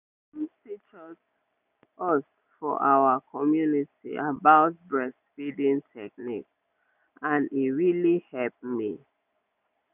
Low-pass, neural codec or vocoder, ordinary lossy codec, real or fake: 3.6 kHz; none; none; real